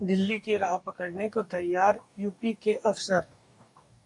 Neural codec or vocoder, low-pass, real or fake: codec, 44.1 kHz, 2.6 kbps, DAC; 10.8 kHz; fake